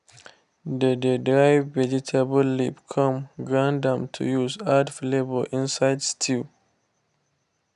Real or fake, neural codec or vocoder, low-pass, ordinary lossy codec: real; none; 10.8 kHz; none